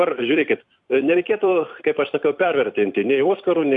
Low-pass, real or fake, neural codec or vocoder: 10.8 kHz; fake; vocoder, 44.1 kHz, 128 mel bands every 256 samples, BigVGAN v2